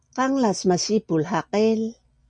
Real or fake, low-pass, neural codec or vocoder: real; 9.9 kHz; none